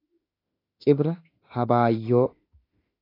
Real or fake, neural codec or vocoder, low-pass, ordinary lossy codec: fake; autoencoder, 48 kHz, 32 numbers a frame, DAC-VAE, trained on Japanese speech; 5.4 kHz; AAC, 24 kbps